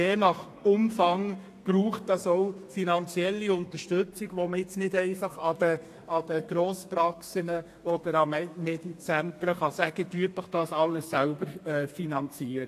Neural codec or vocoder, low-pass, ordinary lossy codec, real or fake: codec, 32 kHz, 1.9 kbps, SNAC; 14.4 kHz; AAC, 64 kbps; fake